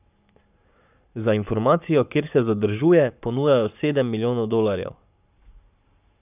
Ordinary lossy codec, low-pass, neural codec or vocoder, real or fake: none; 3.6 kHz; codec, 44.1 kHz, 7.8 kbps, Pupu-Codec; fake